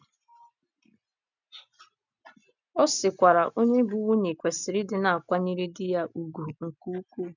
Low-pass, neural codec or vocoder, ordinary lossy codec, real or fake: 7.2 kHz; none; none; real